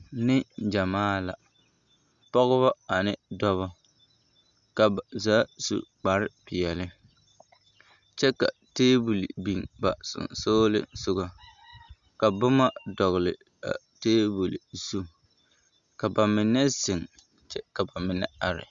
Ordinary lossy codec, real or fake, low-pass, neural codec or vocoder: Opus, 64 kbps; real; 7.2 kHz; none